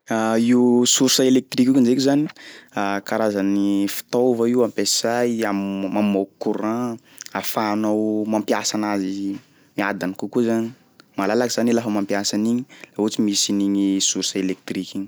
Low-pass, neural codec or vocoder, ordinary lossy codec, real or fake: none; none; none; real